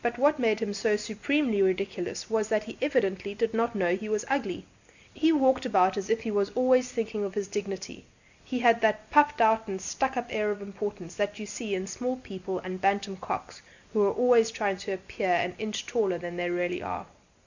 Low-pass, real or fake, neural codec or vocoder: 7.2 kHz; real; none